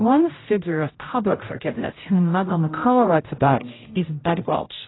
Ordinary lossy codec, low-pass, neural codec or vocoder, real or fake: AAC, 16 kbps; 7.2 kHz; codec, 16 kHz, 0.5 kbps, X-Codec, HuBERT features, trained on general audio; fake